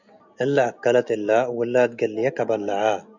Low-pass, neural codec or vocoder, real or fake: 7.2 kHz; none; real